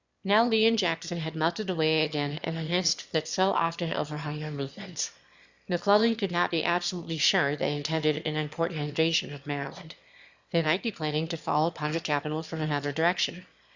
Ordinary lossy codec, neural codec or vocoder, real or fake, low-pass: Opus, 64 kbps; autoencoder, 22.05 kHz, a latent of 192 numbers a frame, VITS, trained on one speaker; fake; 7.2 kHz